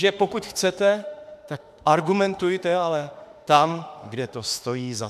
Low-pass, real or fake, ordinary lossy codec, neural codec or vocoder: 14.4 kHz; fake; AAC, 96 kbps; autoencoder, 48 kHz, 32 numbers a frame, DAC-VAE, trained on Japanese speech